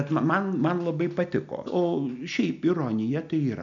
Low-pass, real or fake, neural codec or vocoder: 7.2 kHz; real; none